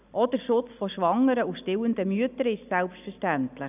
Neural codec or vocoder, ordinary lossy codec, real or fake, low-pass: none; none; real; 3.6 kHz